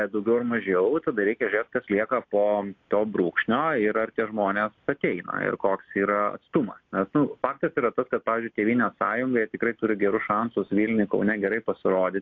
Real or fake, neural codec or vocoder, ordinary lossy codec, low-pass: real; none; AAC, 48 kbps; 7.2 kHz